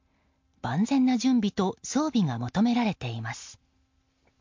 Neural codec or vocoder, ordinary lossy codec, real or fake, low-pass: none; MP3, 48 kbps; real; 7.2 kHz